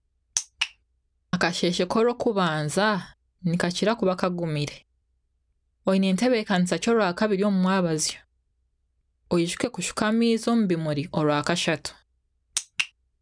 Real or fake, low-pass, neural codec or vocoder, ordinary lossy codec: real; 9.9 kHz; none; none